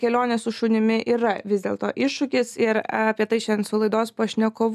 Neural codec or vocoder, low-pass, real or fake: none; 14.4 kHz; real